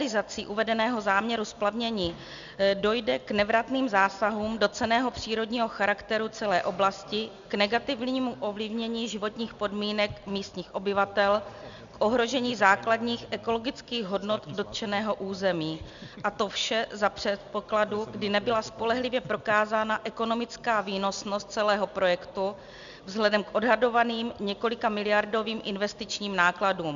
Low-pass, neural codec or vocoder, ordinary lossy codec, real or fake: 7.2 kHz; none; Opus, 64 kbps; real